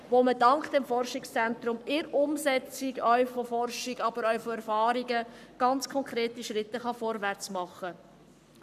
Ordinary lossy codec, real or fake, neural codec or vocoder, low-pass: none; fake; codec, 44.1 kHz, 7.8 kbps, Pupu-Codec; 14.4 kHz